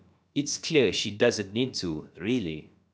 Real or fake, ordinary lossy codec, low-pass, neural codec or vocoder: fake; none; none; codec, 16 kHz, about 1 kbps, DyCAST, with the encoder's durations